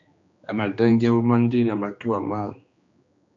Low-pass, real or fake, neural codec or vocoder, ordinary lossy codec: 7.2 kHz; fake; codec, 16 kHz, 2 kbps, X-Codec, HuBERT features, trained on general audio; AAC, 64 kbps